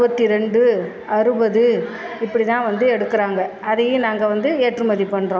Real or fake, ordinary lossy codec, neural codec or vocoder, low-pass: real; none; none; none